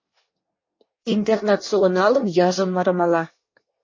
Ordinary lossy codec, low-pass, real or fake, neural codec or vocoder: MP3, 32 kbps; 7.2 kHz; fake; codec, 24 kHz, 1 kbps, SNAC